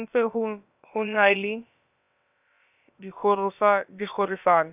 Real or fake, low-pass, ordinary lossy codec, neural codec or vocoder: fake; 3.6 kHz; none; codec, 16 kHz, about 1 kbps, DyCAST, with the encoder's durations